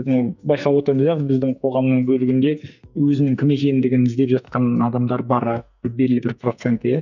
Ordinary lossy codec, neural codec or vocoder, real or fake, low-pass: none; codec, 44.1 kHz, 2.6 kbps, SNAC; fake; 7.2 kHz